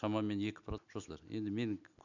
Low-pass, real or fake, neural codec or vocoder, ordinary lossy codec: 7.2 kHz; real; none; none